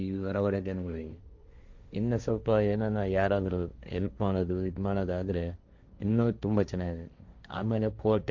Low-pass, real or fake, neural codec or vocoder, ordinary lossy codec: 7.2 kHz; fake; codec, 16 kHz, 1.1 kbps, Voila-Tokenizer; Opus, 64 kbps